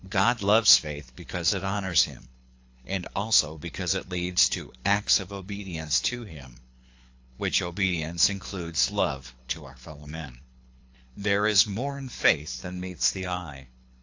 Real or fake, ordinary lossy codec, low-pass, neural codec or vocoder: fake; AAC, 48 kbps; 7.2 kHz; codec, 24 kHz, 6 kbps, HILCodec